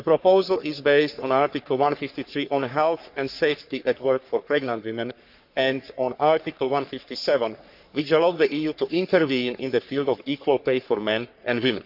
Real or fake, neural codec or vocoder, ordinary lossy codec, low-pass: fake; codec, 44.1 kHz, 3.4 kbps, Pupu-Codec; none; 5.4 kHz